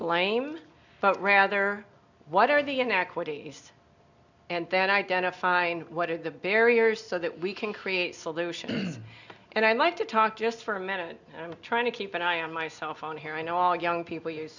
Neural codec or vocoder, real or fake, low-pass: none; real; 7.2 kHz